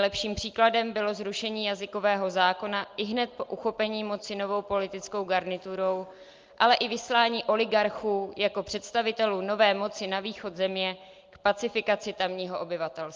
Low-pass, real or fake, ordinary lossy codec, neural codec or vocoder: 7.2 kHz; real; Opus, 32 kbps; none